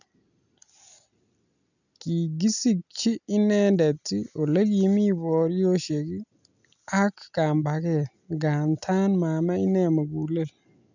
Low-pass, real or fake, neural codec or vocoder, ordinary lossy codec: 7.2 kHz; real; none; none